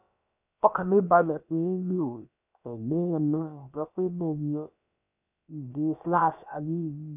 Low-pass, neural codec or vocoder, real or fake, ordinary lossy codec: 3.6 kHz; codec, 16 kHz, about 1 kbps, DyCAST, with the encoder's durations; fake; none